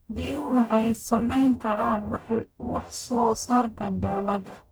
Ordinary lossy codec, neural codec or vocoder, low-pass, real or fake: none; codec, 44.1 kHz, 0.9 kbps, DAC; none; fake